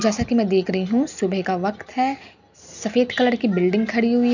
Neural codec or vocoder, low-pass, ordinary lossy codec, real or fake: none; 7.2 kHz; none; real